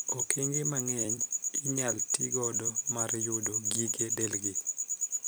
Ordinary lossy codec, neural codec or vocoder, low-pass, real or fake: none; vocoder, 44.1 kHz, 128 mel bands every 256 samples, BigVGAN v2; none; fake